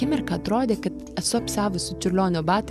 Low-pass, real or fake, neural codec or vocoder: 14.4 kHz; fake; vocoder, 44.1 kHz, 128 mel bands every 512 samples, BigVGAN v2